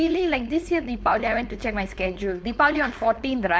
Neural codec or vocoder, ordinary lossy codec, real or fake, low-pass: codec, 16 kHz, 4.8 kbps, FACodec; none; fake; none